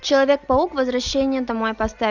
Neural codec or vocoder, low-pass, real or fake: none; 7.2 kHz; real